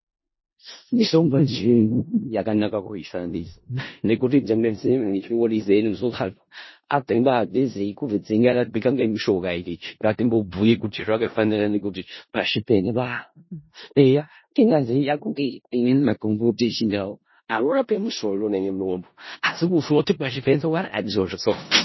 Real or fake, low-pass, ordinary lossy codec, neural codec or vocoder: fake; 7.2 kHz; MP3, 24 kbps; codec, 16 kHz in and 24 kHz out, 0.4 kbps, LongCat-Audio-Codec, four codebook decoder